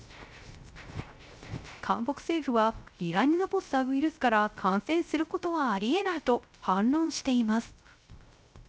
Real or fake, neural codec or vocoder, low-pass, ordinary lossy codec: fake; codec, 16 kHz, 0.3 kbps, FocalCodec; none; none